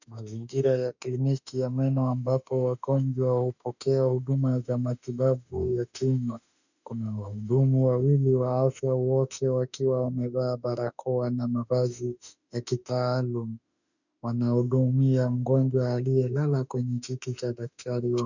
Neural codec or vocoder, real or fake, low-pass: autoencoder, 48 kHz, 32 numbers a frame, DAC-VAE, trained on Japanese speech; fake; 7.2 kHz